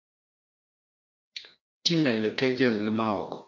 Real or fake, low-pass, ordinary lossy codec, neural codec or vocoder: fake; 7.2 kHz; MP3, 48 kbps; codec, 16 kHz, 1 kbps, FreqCodec, larger model